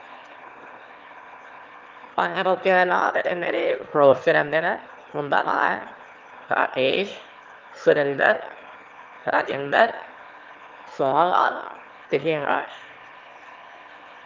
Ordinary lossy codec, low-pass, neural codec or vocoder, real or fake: Opus, 32 kbps; 7.2 kHz; autoencoder, 22.05 kHz, a latent of 192 numbers a frame, VITS, trained on one speaker; fake